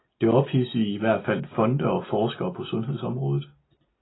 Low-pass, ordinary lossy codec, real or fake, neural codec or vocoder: 7.2 kHz; AAC, 16 kbps; real; none